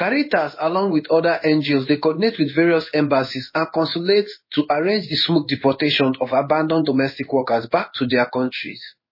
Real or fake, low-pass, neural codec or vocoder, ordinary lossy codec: fake; 5.4 kHz; codec, 16 kHz in and 24 kHz out, 1 kbps, XY-Tokenizer; MP3, 24 kbps